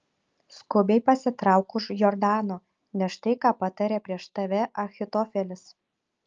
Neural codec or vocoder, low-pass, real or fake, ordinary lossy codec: none; 7.2 kHz; real; Opus, 24 kbps